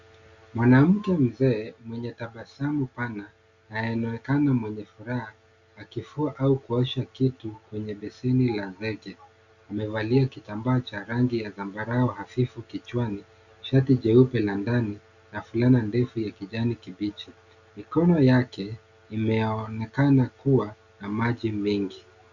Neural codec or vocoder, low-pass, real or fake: none; 7.2 kHz; real